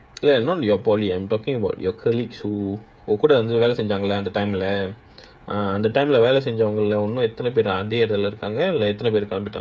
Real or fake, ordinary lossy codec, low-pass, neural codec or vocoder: fake; none; none; codec, 16 kHz, 8 kbps, FreqCodec, smaller model